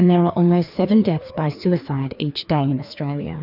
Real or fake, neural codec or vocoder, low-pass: fake; codec, 16 kHz, 2 kbps, FreqCodec, larger model; 5.4 kHz